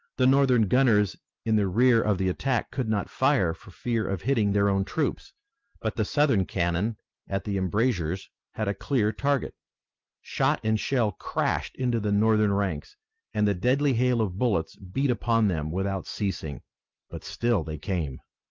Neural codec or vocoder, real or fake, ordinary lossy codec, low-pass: none; real; Opus, 24 kbps; 7.2 kHz